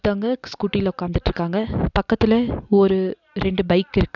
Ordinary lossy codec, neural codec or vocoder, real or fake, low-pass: none; none; real; 7.2 kHz